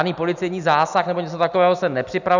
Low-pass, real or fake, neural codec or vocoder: 7.2 kHz; real; none